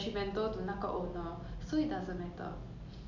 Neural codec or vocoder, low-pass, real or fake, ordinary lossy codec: none; 7.2 kHz; real; none